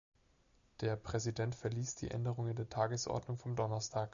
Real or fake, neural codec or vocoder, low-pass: real; none; 7.2 kHz